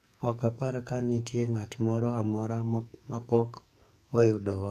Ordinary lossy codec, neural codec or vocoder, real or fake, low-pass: none; codec, 44.1 kHz, 2.6 kbps, SNAC; fake; 14.4 kHz